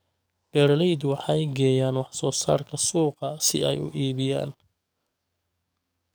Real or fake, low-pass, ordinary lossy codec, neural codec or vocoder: fake; none; none; codec, 44.1 kHz, 7.8 kbps, DAC